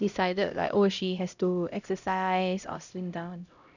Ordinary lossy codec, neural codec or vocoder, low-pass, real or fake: none; codec, 16 kHz, 0.5 kbps, X-Codec, HuBERT features, trained on LibriSpeech; 7.2 kHz; fake